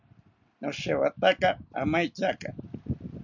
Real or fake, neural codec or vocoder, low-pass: real; none; 7.2 kHz